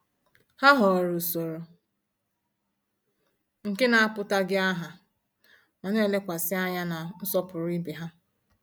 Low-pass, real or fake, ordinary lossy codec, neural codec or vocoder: none; real; none; none